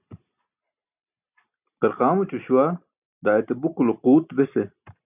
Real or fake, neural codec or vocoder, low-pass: real; none; 3.6 kHz